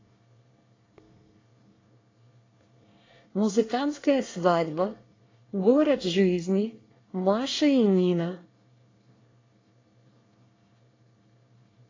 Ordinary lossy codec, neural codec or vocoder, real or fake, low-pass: AAC, 32 kbps; codec, 24 kHz, 1 kbps, SNAC; fake; 7.2 kHz